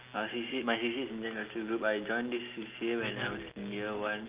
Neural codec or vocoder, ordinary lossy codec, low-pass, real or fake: none; Opus, 32 kbps; 3.6 kHz; real